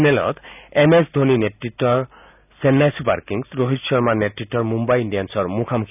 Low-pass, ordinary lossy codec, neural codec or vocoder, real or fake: 3.6 kHz; none; none; real